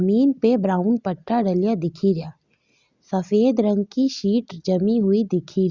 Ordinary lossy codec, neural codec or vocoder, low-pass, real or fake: Opus, 64 kbps; none; 7.2 kHz; real